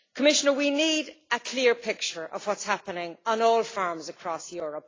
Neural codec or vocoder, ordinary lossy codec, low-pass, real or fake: none; AAC, 32 kbps; 7.2 kHz; real